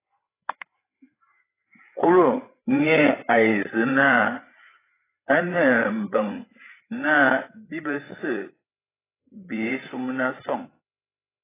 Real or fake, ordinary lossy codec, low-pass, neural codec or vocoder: fake; AAC, 16 kbps; 3.6 kHz; codec, 16 kHz, 8 kbps, FreqCodec, larger model